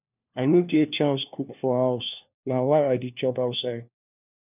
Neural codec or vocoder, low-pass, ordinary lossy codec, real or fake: codec, 16 kHz, 1 kbps, FunCodec, trained on LibriTTS, 50 frames a second; 3.6 kHz; none; fake